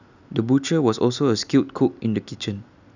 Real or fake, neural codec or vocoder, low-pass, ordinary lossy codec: real; none; 7.2 kHz; none